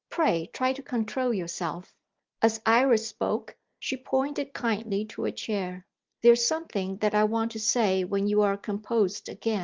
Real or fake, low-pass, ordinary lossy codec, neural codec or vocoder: real; 7.2 kHz; Opus, 24 kbps; none